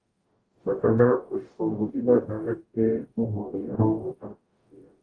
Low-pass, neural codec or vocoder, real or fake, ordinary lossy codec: 9.9 kHz; codec, 44.1 kHz, 0.9 kbps, DAC; fake; Opus, 32 kbps